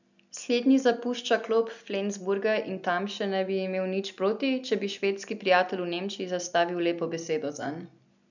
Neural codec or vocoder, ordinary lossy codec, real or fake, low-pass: none; none; real; 7.2 kHz